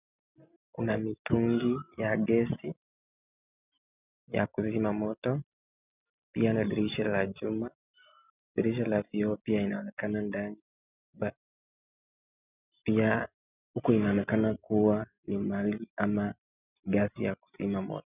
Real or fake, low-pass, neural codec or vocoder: real; 3.6 kHz; none